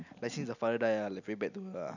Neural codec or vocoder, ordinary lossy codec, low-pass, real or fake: none; none; 7.2 kHz; real